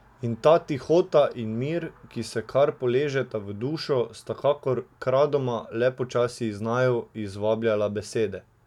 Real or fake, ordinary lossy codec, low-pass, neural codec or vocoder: real; none; 19.8 kHz; none